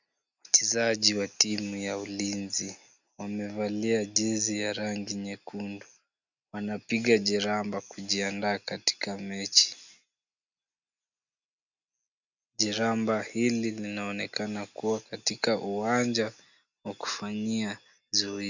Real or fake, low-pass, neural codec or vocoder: real; 7.2 kHz; none